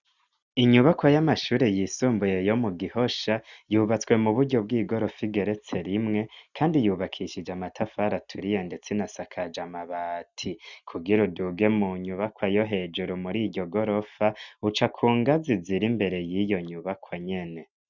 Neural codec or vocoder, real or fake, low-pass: none; real; 7.2 kHz